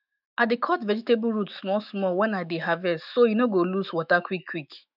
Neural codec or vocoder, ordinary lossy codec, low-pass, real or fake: autoencoder, 48 kHz, 128 numbers a frame, DAC-VAE, trained on Japanese speech; none; 5.4 kHz; fake